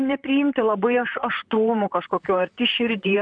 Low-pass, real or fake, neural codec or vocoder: 9.9 kHz; fake; vocoder, 44.1 kHz, 128 mel bands, Pupu-Vocoder